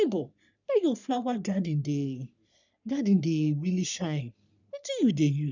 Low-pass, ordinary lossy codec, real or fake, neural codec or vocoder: 7.2 kHz; none; fake; codec, 44.1 kHz, 3.4 kbps, Pupu-Codec